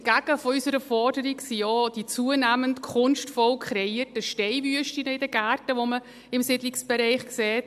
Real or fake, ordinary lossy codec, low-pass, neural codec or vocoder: real; AAC, 96 kbps; 14.4 kHz; none